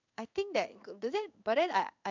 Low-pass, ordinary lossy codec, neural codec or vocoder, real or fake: 7.2 kHz; none; codec, 16 kHz in and 24 kHz out, 1 kbps, XY-Tokenizer; fake